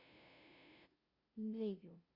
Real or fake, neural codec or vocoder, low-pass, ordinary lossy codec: fake; codec, 16 kHz, 0.5 kbps, FunCodec, trained on LibriTTS, 25 frames a second; 5.4 kHz; Opus, 64 kbps